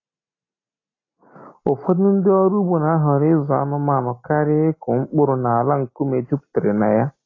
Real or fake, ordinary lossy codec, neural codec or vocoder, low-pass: real; AAC, 32 kbps; none; 7.2 kHz